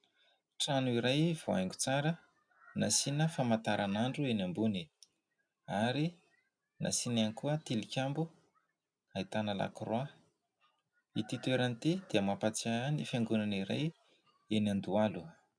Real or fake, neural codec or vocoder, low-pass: real; none; 9.9 kHz